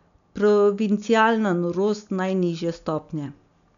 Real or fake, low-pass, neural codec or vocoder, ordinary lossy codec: real; 7.2 kHz; none; MP3, 96 kbps